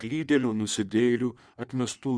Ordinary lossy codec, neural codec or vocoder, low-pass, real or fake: Opus, 64 kbps; codec, 16 kHz in and 24 kHz out, 1.1 kbps, FireRedTTS-2 codec; 9.9 kHz; fake